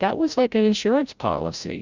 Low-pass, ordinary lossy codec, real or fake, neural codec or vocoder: 7.2 kHz; Opus, 64 kbps; fake; codec, 16 kHz, 0.5 kbps, FreqCodec, larger model